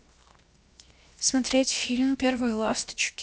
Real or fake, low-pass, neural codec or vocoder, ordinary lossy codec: fake; none; codec, 16 kHz, 0.7 kbps, FocalCodec; none